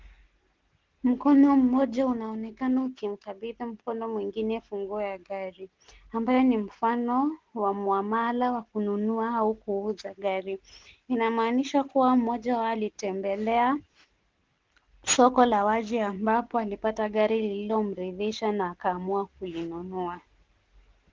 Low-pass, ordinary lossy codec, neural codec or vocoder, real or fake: 7.2 kHz; Opus, 16 kbps; none; real